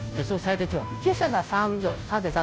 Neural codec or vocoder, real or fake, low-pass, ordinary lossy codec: codec, 16 kHz, 0.5 kbps, FunCodec, trained on Chinese and English, 25 frames a second; fake; none; none